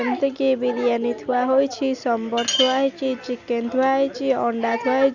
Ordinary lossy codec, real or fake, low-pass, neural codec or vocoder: none; real; 7.2 kHz; none